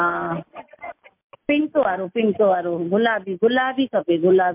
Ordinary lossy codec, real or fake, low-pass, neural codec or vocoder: MP3, 32 kbps; real; 3.6 kHz; none